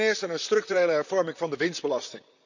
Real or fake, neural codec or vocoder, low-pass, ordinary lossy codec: fake; vocoder, 44.1 kHz, 128 mel bands, Pupu-Vocoder; 7.2 kHz; none